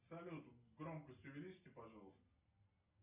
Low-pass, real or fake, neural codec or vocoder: 3.6 kHz; real; none